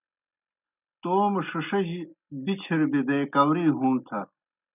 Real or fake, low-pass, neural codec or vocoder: real; 3.6 kHz; none